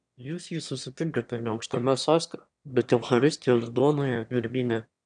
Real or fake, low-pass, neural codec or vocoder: fake; 9.9 kHz; autoencoder, 22.05 kHz, a latent of 192 numbers a frame, VITS, trained on one speaker